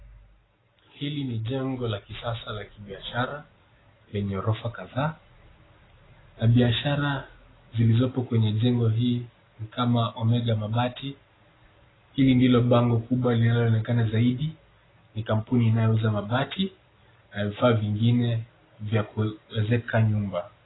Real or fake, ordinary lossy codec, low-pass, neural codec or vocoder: real; AAC, 16 kbps; 7.2 kHz; none